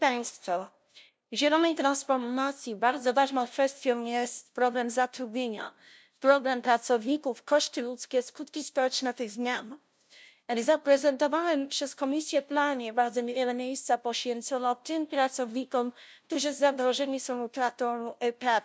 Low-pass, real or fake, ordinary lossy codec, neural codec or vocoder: none; fake; none; codec, 16 kHz, 0.5 kbps, FunCodec, trained on LibriTTS, 25 frames a second